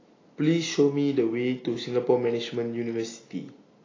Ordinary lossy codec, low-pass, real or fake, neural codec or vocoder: AAC, 32 kbps; 7.2 kHz; real; none